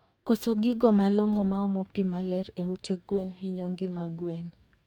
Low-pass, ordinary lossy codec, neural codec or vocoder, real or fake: 19.8 kHz; MP3, 96 kbps; codec, 44.1 kHz, 2.6 kbps, DAC; fake